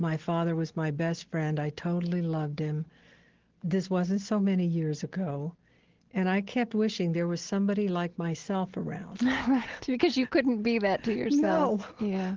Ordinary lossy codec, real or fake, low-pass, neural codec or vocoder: Opus, 16 kbps; real; 7.2 kHz; none